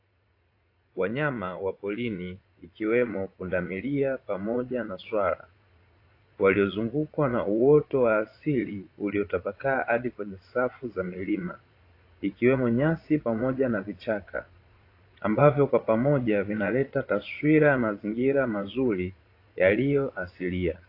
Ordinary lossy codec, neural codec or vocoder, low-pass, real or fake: AAC, 32 kbps; vocoder, 22.05 kHz, 80 mel bands, Vocos; 5.4 kHz; fake